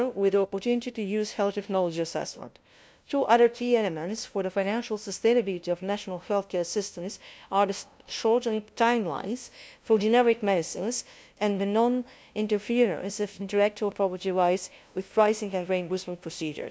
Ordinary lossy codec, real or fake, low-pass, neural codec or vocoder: none; fake; none; codec, 16 kHz, 0.5 kbps, FunCodec, trained on LibriTTS, 25 frames a second